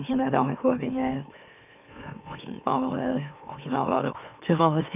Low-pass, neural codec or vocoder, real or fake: 3.6 kHz; autoencoder, 44.1 kHz, a latent of 192 numbers a frame, MeloTTS; fake